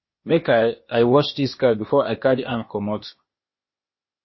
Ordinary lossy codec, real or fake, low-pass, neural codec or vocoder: MP3, 24 kbps; fake; 7.2 kHz; codec, 16 kHz, 0.8 kbps, ZipCodec